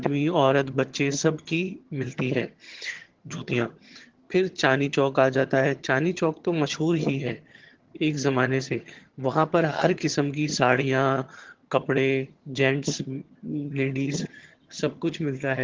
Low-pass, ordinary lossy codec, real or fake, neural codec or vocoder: 7.2 kHz; Opus, 16 kbps; fake; vocoder, 22.05 kHz, 80 mel bands, HiFi-GAN